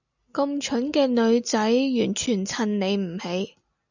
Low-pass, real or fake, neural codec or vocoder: 7.2 kHz; real; none